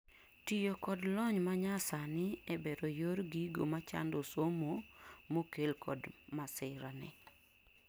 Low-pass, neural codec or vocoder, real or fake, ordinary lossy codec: none; none; real; none